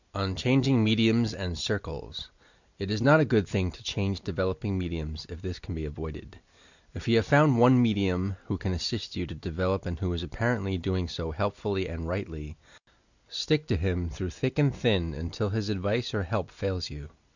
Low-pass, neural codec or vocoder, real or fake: 7.2 kHz; none; real